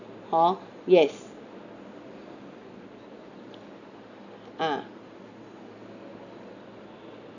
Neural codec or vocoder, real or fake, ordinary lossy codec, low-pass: none; real; none; 7.2 kHz